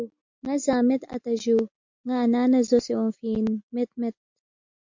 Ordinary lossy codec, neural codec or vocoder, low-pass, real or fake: MP3, 48 kbps; none; 7.2 kHz; real